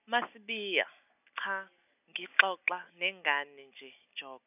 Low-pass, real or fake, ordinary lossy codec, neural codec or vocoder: 3.6 kHz; real; none; none